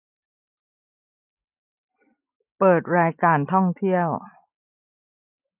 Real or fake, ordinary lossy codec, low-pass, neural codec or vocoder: real; none; 3.6 kHz; none